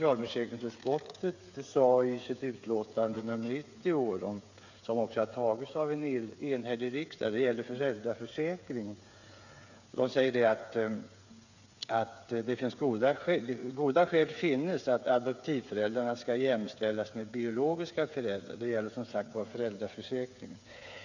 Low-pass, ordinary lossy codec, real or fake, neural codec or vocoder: 7.2 kHz; none; fake; codec, 16 kHz, 8 kbps, FreqCodec, smaller model